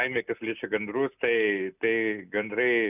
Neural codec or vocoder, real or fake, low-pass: none; real; 3.6 kHz